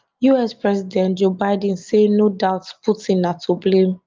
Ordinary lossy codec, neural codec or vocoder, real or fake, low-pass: Opus, 24 kbps; none; real; 7.2 kHz